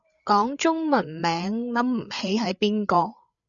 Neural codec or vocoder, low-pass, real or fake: codec, 16 kHz, 8 kbps, FreqCodec, larger model; 7.2 kHz; fake